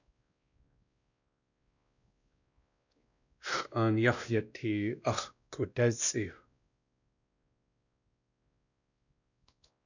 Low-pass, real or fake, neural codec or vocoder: 7.2 kHz; fake; codec, 16 kHz, 1 kbps, X-Codec, WavLM features, trained on Multilingual LibriSpeech